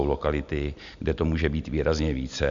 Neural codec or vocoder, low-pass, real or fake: none; 7.2 kHz; real